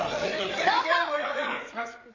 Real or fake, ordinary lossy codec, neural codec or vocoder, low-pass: fake; MP3, 48 kbps; codec, 16 kHz, 8 kbps, FreqCodec, larger model; 7.2 kHz